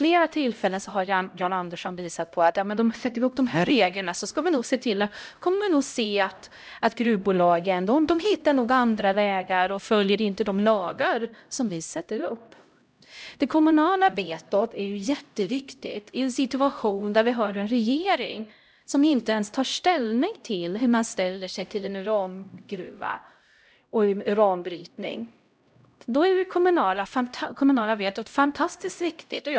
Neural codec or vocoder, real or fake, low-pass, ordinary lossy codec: codec, 16 kHz, 0.5 kbps, X-Codec, HuBERT features, trained on LibriSpeech; fake; none; none